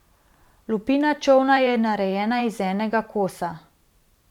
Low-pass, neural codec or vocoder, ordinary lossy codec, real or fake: 19.8 kHz; vocoder, 44.1 kHz, 128 mel bands every 512 samples, BigVGAN v2; none; fake